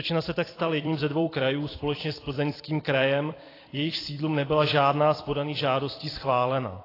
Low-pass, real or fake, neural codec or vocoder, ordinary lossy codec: 5.4 kHz; fake; vocoder, 44.1 kHz, 128 mel bands every 256 samples, BigVGAN v2; AAC, 24 kbps